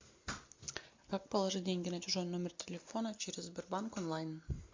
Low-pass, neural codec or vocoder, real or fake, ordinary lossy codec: 7.2 kHz; none; real; MP3, 48 kbps